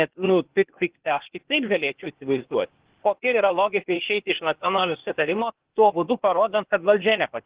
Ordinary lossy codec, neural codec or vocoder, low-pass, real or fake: Opus, 16 kbps; codec, 16 kHz, 0.8 kbps, ZipCodec; 3.6 kHz; fake